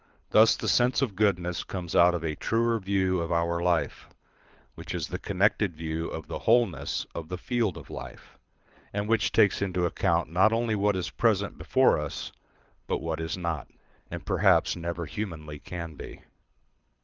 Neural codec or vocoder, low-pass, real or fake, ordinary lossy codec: codec, 24 kHz, 6 kbps, HILCodec; 7.2 kHz; fake; Opus, 24 kbps